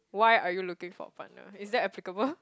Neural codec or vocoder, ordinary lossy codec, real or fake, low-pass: none; none; real; none